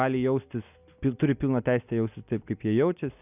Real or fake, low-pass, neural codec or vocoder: real; 3.6 kHz; none